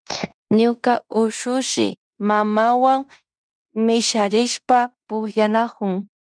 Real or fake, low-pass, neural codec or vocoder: fake; 9.9 kHz; codec, 16 kHz in and 24 kHz out, 0.9 kbps, LongCat-Audio-Codec, fine tuned four codebook decoder